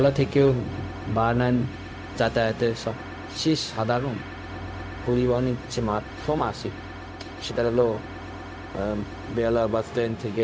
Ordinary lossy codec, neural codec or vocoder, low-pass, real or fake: none; codec, 16 kHz, 0.4 kbps, LongCat-Audio-Codec; none; fake